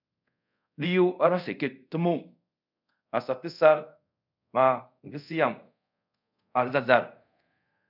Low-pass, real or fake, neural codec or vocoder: 5.4 kHz; fake; codec, 24 kHz, 0.5 kbps, DualCodec